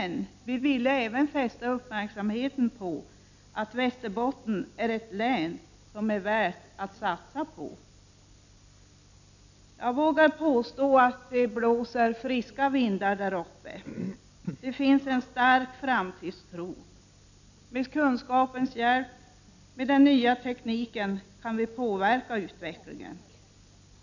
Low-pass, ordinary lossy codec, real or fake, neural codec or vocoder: 7.2 kHz; none; real; none